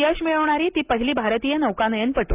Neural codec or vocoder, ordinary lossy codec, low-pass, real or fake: none; Opus, 24 kbps; 3.6 kHz; real